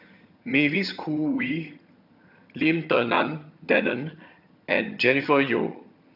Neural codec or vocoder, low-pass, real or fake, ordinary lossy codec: vocoder, 22.05 kHz, 80 mel bands, HiFi-GAN; 5.4 kHz; fake; none